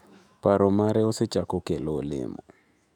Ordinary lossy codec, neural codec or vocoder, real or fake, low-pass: none; autoencoder, 48 kHz, 128 numbers a frame, DAC-VAE, trained on Japanese speech; fake; 19.8 kHz